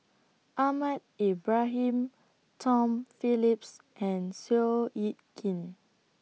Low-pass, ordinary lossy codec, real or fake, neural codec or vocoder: none; none; real; none